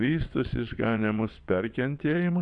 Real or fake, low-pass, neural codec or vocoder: fake; 9.9 kHz; vocoder, 22.05 kHz, 80 mel bands, WaveNeXt